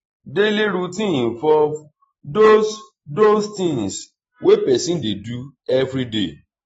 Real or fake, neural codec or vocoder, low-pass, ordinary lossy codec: real; none; 19.8 kHz; AAC, 24 kbps